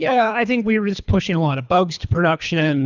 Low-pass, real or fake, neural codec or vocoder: 7.2 kHz; fake; codec, 24 kHz, 3 kbps, HILCodec